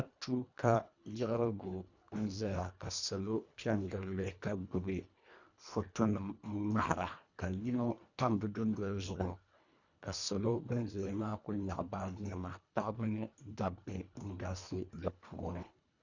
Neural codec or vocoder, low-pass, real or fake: codec, 24 kHz, 1.5 kbps, HILCodec; 7.2 kHz; fake